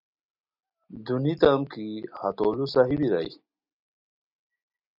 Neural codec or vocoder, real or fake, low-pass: none; real; 5.4 kHz